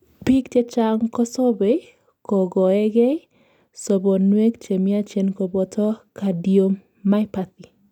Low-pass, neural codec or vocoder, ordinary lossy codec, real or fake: 19.8 kHz; none; none; real